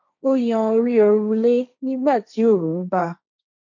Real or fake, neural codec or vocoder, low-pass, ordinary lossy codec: fake; codec, 16 kHz, 1.1 kbps, Voila-Tokenizer; 7.2 kHz; none